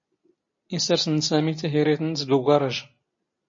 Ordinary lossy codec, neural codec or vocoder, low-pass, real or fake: MP3, 32 kbps; none; 7.2 kHz; real